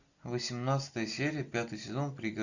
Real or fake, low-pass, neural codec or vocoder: real; 7.2 kHz; none